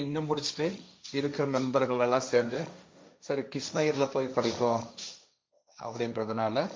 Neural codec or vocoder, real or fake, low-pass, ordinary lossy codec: codec, 16 kHz, 1.1 kbps, Voila-Tokenizer; fake; none; none